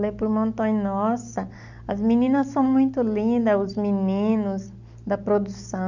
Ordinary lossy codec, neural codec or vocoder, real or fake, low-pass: none; none; real; 7.2 kHz